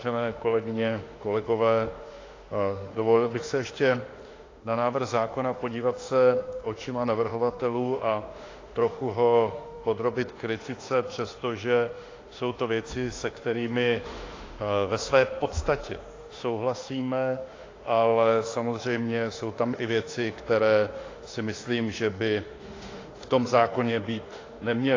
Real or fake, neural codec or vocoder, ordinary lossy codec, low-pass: fake; autoencoder, 48 kHz, 32 numbers a frame, DAC-VAE, trained on Japanese speech; AAC, 32 kbps; 7.2 kHz